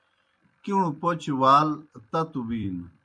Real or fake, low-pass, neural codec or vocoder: fake; 9.9 kHz; vocoder, 44.1 kHz, 128 mel bands every 256 samples, BigVGAN v2